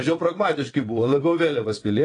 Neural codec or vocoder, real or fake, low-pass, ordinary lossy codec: vocoder, 22.05 kHz, 80 mel bands, WaveNeXt; fake; 9.9 kHz; AAC, 32 kbps